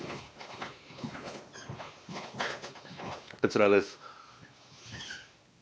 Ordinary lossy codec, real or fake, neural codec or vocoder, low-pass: none; fake; codec, 16 kHz, 2 kbps, X-Codec, WavLM features, trained on Multilingual LibriSpeech; none